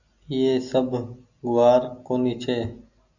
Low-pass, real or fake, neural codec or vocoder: 7.2 kHz; real; none